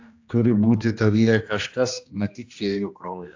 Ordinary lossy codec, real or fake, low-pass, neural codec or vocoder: AAC, 48 kbps; fake; 7.2 kHz; codec, 16 kHz, 1 kbps, X-Codec, HuBERT features, trained on general audio